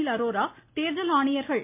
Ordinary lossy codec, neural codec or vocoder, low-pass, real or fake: MP3, 24 kbps; none; 3.6 kHz; real